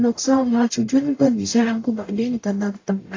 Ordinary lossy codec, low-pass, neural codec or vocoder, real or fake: AAC, 32 kbps; 7.2 kHz; codec, 44.1 kHz, 0.9 kbps, DAC; fake